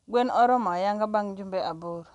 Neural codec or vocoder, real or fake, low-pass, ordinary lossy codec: none; real; 10.8 kHz; none